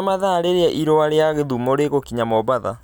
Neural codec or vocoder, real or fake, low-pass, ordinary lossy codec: none; real; none; none